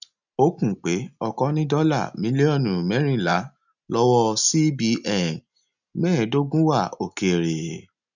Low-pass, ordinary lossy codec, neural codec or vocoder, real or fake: 7.2 kHz; none; none; real